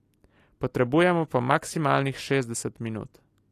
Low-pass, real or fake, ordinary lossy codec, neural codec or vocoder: 14.4 kHz; real; AAC, 48 kbps; none